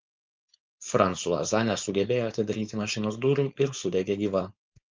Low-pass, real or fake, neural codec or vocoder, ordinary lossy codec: 7.2 kHz; fake; codec, 16 kHz, 4.8 kbps, FACodec; Opus, 24 kbps